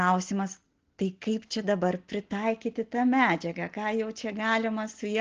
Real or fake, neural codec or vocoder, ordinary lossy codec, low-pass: real; none; Opus, 16 kbps; 7.2 kHz